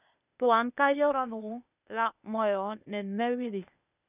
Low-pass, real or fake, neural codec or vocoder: 3.6 kHz; fake; codec, 16 kHz, 0.8 kbps, ZipCodec